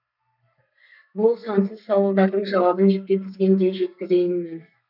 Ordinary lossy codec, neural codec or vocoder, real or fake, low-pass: none; codec, 32 kHz, 1.9 kbps, SNAC; fake; 5.4 kHz